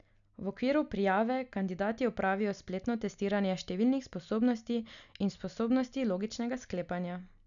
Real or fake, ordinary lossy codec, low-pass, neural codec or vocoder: real; none; 7.2 kHz; none